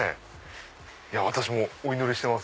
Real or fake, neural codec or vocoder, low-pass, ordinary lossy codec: real; none; none; none